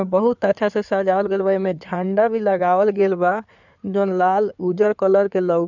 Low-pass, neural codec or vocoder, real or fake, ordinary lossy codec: 7.2 kHz; codec, 16 kHz in and 24 kHz out, 2.2 kbps, FireRedTTS-2 codec; fake; none